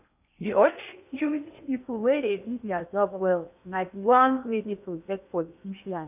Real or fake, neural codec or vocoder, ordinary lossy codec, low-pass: fake; codec, 16 kHz in and 24 kHz out, 0.8 kbps, FocalCodec, streaming, 65536 codes; none; 3.6 kHz